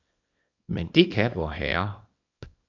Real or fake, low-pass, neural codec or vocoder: fake; 7.2 kHz; codec, 24 kHz, 0.9 kbps, WavTokenizer, small release